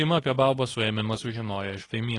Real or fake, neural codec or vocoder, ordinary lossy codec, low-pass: fake; codec, 24 kHz, 0.9 kbps, WavTokenizer, medium speech release version 2; AAC, 32 kbps; 10.8 kHz